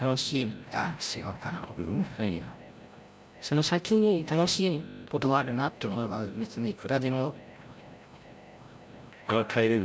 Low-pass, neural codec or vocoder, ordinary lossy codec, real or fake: none; codec, 16 kHz, 0.5 kbps, FreqCodec, larger model; none; fake